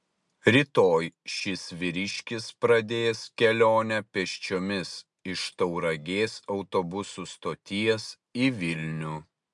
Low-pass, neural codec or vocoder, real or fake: 10.8 kHz; none; real